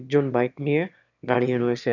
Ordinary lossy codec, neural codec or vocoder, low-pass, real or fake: none; autoencoder, 22.05 kHz, a latent of 192 numbers a frame, VITS, trained on one speaker; 7.2 kHz; fake